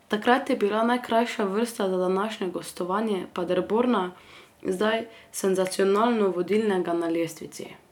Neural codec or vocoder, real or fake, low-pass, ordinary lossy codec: none; real; 19.8 kHz; none